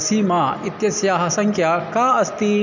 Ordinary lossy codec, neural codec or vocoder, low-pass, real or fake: none; none; 7.2 kHz; real